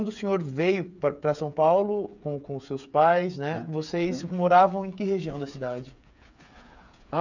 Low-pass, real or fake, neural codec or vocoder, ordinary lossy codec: 7.2 kHz; fake; codec, 16 kHz, 8 kbps, FreqCodec, smaller model; none